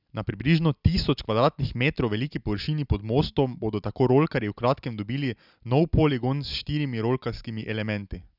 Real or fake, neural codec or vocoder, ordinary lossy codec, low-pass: real; none; none; 5.4 kHz